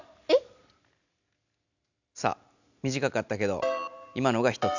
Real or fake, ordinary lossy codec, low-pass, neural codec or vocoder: real; none; 7.2 kHz; none